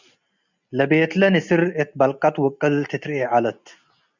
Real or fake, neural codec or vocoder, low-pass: real; none; 7.2 kHz